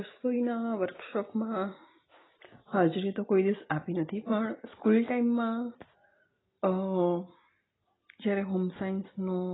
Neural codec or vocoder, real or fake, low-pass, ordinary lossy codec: none; real; 7.2 kHz; AAC, 16 kbps